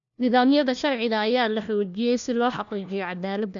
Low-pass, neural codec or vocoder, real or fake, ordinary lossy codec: 7.2 kHz; codec, 16 kHz, 1 kbps, FunCodec, trained on LibriTTS, 50 frames a second; fake; none